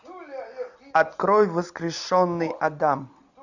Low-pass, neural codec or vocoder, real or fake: 7.2 kHz; none; real